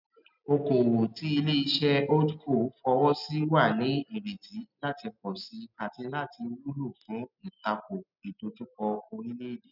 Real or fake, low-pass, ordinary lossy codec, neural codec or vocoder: real; 5.4 kHz; none; none